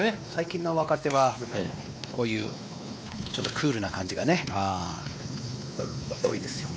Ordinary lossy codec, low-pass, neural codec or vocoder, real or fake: none; none; codec, 16 kHz, 2 kbps, X-Codec, WavLM features, trained on Multilingual LibriSpeech; fake